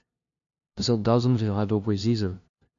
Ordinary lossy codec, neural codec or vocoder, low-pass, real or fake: AAC, 64 kbps; codec, 16 kHz, 0.5 kbps, FunCodec, trained on LibriTTS, 25 frames a second; 7.2 kHz; fake